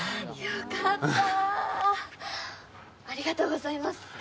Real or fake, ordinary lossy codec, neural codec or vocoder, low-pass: real; none; none; none